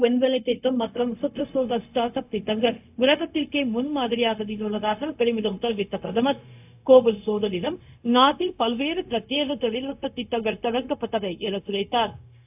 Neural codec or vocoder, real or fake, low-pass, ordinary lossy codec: codec, 16 kHz, 0.4 kbps, LongCat-Audio-Codec; fake; 3.6 kHz; AAC, 32 kbps